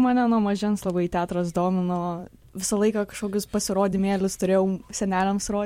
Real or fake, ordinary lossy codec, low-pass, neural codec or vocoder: fake; MP3, 64 kbps; 19.8 kHz; vocoder, 44.1 kHz, 128 mel bands every 256 samples, BigVGAN v2